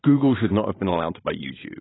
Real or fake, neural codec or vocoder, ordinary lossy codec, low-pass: fake; vocoder, 44.1 kHz, 128 mel bands every 512 samples, BigVGAN v2; AAC, 16 kbps; 7.2 kHz